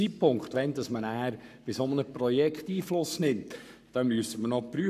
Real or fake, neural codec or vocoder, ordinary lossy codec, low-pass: fake; codec, 44.1 kHz, 7.8 kbps, Pupu-Codec; none; 14.4 kHz